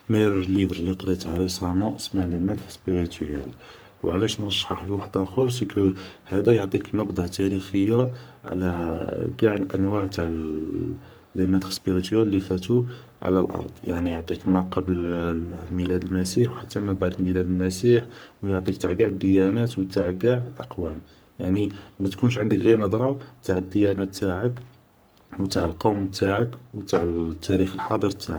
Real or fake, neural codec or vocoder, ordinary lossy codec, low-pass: fake; codec, 44.1 kHz, 3.4 kbps, Pupu-Codec; none; none